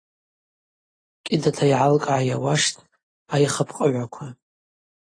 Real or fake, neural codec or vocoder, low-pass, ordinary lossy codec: real; none; 9.9 kHz; AAC, 32 kbps